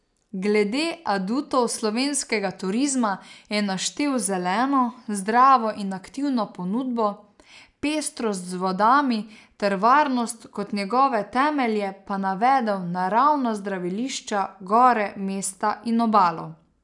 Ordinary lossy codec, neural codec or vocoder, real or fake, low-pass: none; none; real; 10.8 kHz